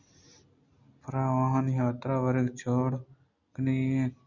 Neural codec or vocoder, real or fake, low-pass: none; real; 7.2 kHz